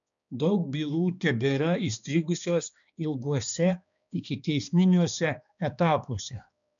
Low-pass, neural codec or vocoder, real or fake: 7.2 kHz; codec, 16 kHz, 2 kbps, X-Codec, HuBERT features, trained on balanced general audio; fake